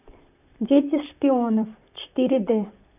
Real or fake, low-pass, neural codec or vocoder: fake; 3.6 kHz; vocoder, 44.1 kHz, 128 mel bands, Pupu-Vocoder